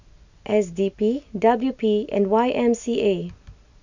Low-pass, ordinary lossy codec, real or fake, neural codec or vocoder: 7.2 kHz; none; real; none